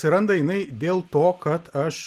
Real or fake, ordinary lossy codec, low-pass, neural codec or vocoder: real; Opus, 24 kbps; 14.4 kHz; none